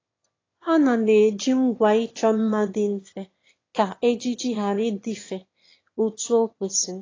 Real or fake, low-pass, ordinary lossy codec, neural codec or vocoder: fake; 7.2 kHz; AAC, 32 kbps; autoencoder, 22.05 kHz, a latent of 192 numbers a frame, VITS, trained on one speaker